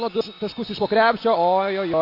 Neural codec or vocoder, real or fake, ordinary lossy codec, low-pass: none; real; AAC, 32 kbps; 5.4 kHz